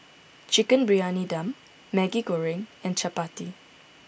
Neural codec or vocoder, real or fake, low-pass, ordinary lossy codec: none; real; none; none